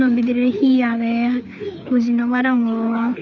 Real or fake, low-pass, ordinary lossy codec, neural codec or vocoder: fake; 7.2 kHz; none; codec, 16 kHz, 4 kbps, FreqCodec, larger model